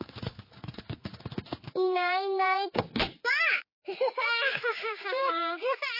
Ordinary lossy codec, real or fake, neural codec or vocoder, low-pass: MP3, 24 kbps; fake; codec, 16 kHz, 4 kbps, X-Codec, HuBERT features, trained on general audio; 5.4 kHz